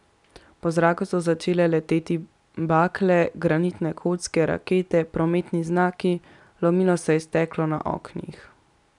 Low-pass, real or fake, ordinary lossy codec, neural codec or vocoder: 10.8 kHz; real; none; none